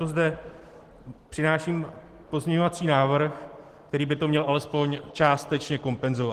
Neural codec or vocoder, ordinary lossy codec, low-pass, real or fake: none; Opus, 16 kbps; 14.4 kHz; real